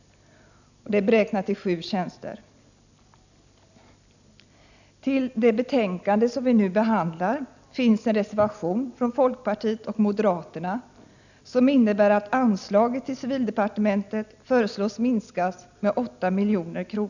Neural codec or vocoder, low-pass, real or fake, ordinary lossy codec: none; 7.2 kHz; real; none